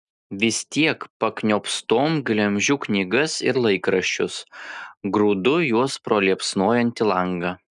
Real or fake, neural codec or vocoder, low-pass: real; none; 10.8 kHz